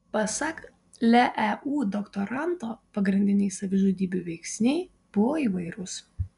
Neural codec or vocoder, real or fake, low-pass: none; real; 10.8 kHz